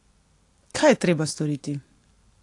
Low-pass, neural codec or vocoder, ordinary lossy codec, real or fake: 10.8 kHz; none; AAC, 48 kbps; real